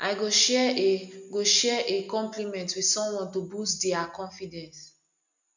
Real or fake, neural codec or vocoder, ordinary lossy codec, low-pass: real; none; none; 7.2 kHz